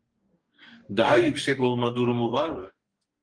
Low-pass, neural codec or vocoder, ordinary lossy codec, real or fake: 9.9 kHz; codec, 44.1 kHz, 2.6 kbps, DAC; Opus, 24 kbps; fake